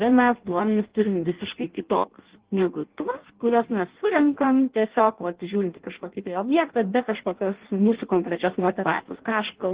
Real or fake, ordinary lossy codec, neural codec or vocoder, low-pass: fake; Opus, 16 kbps; codec, 16 kHz in and 24 kHz out, 0.6 kbps, FireRedTTS-2 codec; 3.6 kHz